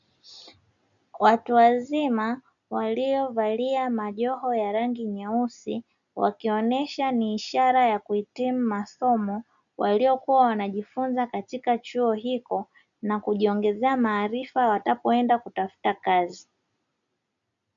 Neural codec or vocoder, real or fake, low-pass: none; real; 7.2 kHz